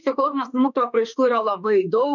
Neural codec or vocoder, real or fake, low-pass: autoencoder, 48 kHz, 32 numbers a frame, DAC-VAE, trained on Japanese speech; fake; 7.2 kHz